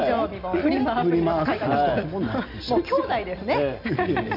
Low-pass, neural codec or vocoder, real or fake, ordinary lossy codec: 5.4 kHz; none; real; none